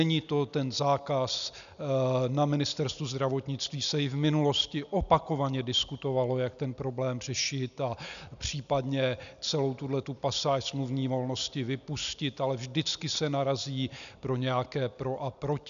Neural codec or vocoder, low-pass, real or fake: none; 7.2 kHz; real